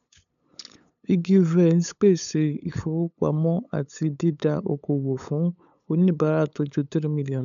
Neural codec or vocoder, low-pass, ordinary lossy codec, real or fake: codec, 16 kHz, 8 kbps, FunCodec, trained on LibriTTS, 25 frames a second; 7.2 kHz; none; fake